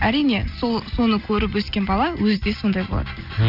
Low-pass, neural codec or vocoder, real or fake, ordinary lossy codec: 5.4 kHz; none; real; none